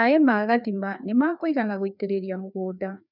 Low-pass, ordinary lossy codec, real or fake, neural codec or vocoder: 5.4 kHz; none; fake; codec, 16 kHz, 2 kbps, FreqCodec, larger model